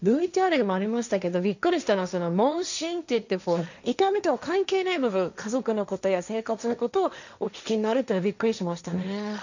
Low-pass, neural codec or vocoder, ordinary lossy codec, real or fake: 7.2 kHz; codec, 16 kHz, 1.1 kbps, Voila-Tokenizer; none; fake